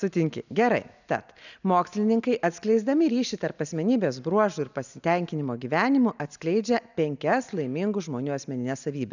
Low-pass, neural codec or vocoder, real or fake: 7.2 kHz; none; real